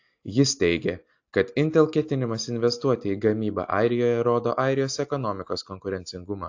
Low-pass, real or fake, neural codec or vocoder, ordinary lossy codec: 7.2 kHz; real; none; AAC, 48 kbps